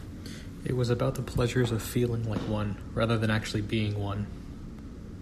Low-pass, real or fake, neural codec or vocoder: 14.4 kHz; real; none